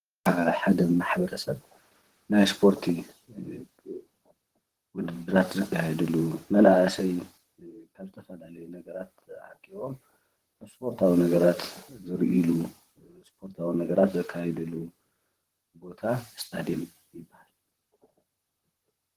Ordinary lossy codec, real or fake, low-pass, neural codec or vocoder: Opus, 16 kbps; fake; 14.4 kHz; vocoder, 44.1 kHz, 128 mel bands, Pupu-Vocoder